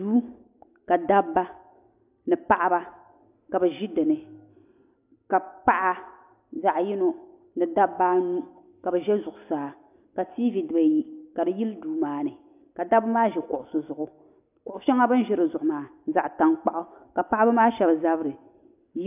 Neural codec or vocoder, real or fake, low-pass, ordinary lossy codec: none; real; 3.6 kHz; AAC, 32 kbps